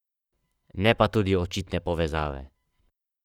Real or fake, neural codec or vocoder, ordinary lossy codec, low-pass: fake; codec, 44.1 kHz, 7.8 kbps, Pupu-Codec; none; 19.8 kHz